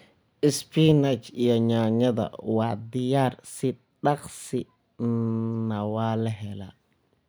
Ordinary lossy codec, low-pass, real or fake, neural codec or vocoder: none; none; real; none